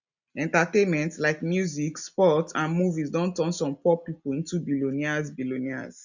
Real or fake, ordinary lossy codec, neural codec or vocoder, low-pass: real; none; none; 7.2 kHz